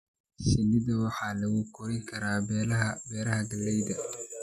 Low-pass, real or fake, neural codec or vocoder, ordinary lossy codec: 14.4 kHz; real; none; none